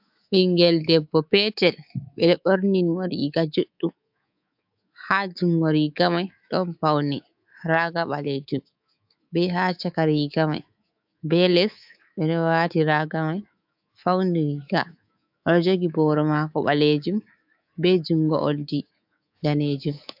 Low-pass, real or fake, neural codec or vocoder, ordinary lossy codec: 5.4 kHz; fake; codec, 24 kHz, 3.1 kbps, DualCodec; AAC, 48 kbps